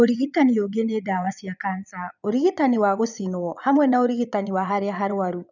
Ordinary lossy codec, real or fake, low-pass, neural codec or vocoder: none; fake; 7.2 kHz; vocoder, 22.05 kHz, 80 mel bands, Vocos